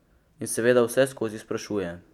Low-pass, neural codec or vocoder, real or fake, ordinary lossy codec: 19.8 kHz; none; real; none